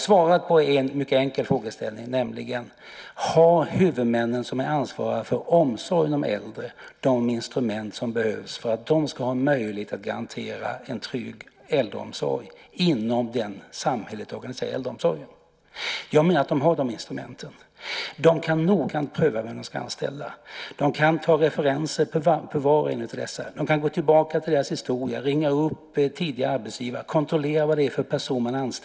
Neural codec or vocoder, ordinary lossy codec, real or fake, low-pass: none; none; real; none